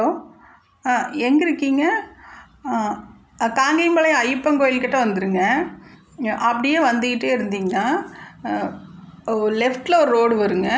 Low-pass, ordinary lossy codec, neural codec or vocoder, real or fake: none; none; none; real